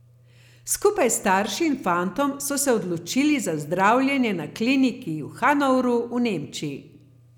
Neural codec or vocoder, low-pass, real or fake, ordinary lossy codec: none; 19.8 kHz; real; none